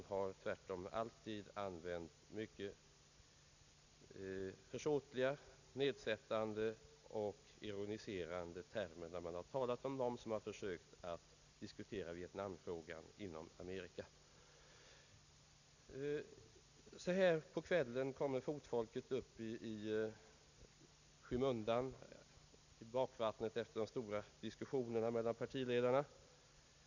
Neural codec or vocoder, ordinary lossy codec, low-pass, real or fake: none; none; 7.2 kHz; real